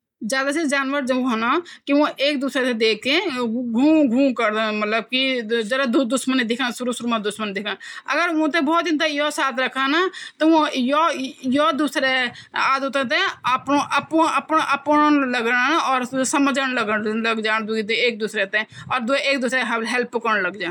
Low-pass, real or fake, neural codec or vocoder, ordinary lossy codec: 19.8 kHz; real; none; none